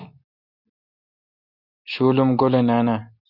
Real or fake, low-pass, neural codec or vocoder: real; 5.4 kHz; none